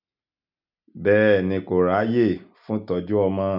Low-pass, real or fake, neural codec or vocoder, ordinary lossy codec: 5.4 kHz; real; none; none